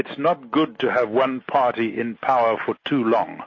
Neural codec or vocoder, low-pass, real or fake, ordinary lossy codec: none; 7.2 kHz; real; MP3, 32 kbps